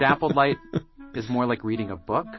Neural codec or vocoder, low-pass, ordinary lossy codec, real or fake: none; 7.2 kHz; MP3, 24 kbps; real